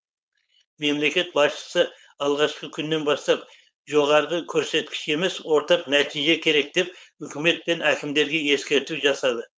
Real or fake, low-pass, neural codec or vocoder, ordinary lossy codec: fake; none; codec, 16 kHz, 4.8 kbps, FACodec; none